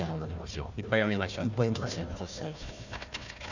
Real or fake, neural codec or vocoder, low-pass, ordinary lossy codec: fake; codec, 16 kHz, 1 kbps, FunCodec, trained on Chinese and English, 50 frames a second; 7.2 kHz; none